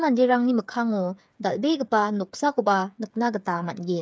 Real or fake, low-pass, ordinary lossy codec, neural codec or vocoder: fake; none; none; codec, 16 kHz, 8 kbps, FreqCodec, smaller model